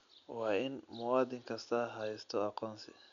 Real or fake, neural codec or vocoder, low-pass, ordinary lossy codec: real; none; 7.2 kHz; none